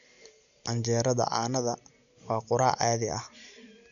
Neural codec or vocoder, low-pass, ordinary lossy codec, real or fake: none; 7.2 kHz; none; real